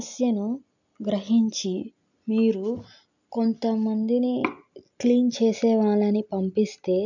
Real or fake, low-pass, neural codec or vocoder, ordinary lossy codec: real; 7.2 kHz; none; none